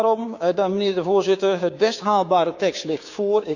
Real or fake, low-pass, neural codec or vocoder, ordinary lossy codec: fake; 7.2 kHz; codec, 16 kHz, 6 kbps, DAC; none